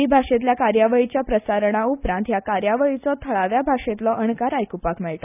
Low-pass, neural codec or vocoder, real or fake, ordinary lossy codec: 3.6 kHz; none; real; none